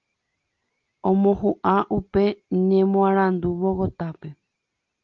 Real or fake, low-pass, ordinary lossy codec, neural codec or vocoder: real; 7.2 kHz; Opus, 32 kbps; none